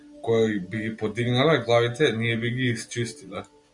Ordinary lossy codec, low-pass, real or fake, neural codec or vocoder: MP3, 64 kbps; 10.8 kHz; real; none